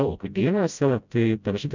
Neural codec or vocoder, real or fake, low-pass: codec, 16 kHz, 0.5 kbps, FreqCodec, smaller model; fake; 7.2 kHz